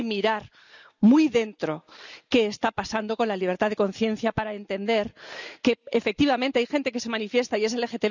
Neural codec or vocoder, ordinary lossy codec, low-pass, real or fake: none; none; 7.2 kHz; real